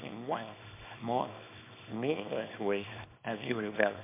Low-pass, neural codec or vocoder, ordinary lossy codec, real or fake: 3.6 kHz; codec, 24 kHz, 0.9 kbps, WavTokenizer, small release; MP3, 32 kbps; fake